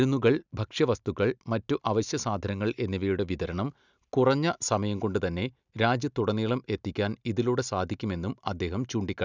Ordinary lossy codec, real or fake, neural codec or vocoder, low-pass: none; real; none; 7.2 kHz